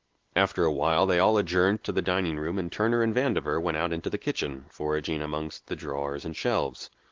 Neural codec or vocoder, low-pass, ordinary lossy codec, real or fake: none; 7.2 kHz; Opus, 16 kbps; real